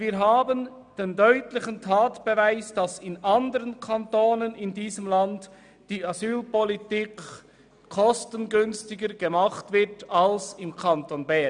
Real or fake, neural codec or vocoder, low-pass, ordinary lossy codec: real; none; 9.9 kHz; none